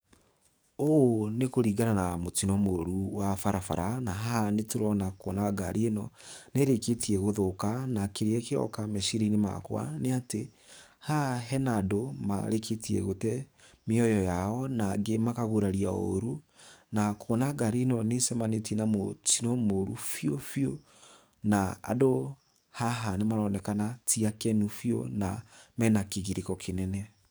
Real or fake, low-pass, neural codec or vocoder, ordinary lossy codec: fake; none; codec, 44.1 kHz, 7.8 kbps, DAC; none